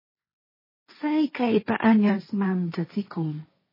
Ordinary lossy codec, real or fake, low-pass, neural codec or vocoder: MP3, 24 kbps; fake; 5.4 kHz; codec, 16 kHz, 1.1 kbps, Voila-Tokenizer